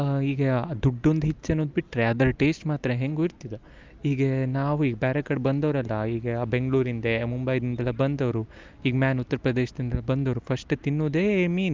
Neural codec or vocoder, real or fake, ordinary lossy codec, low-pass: none; real; Opus, 32 kbps; 7.2 kHz